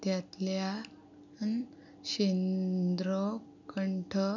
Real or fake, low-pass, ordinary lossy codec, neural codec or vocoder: real; 7.2 kHz; none; none